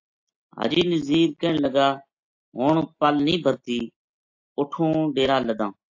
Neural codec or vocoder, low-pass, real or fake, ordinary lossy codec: none; 7.2 kHz; real; AAC, 48 kbps